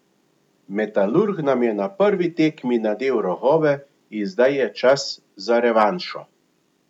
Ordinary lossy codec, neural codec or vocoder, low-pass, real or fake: none; none; 19.8 kHz; real